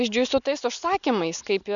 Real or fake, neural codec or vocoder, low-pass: real; none; 7.2 kHz